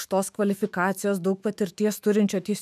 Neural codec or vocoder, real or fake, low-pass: autoencoder, 48 kHz, 128 numbers a frame, DAC-VAE, trained on Japanese speech; fake; 14.4 kHz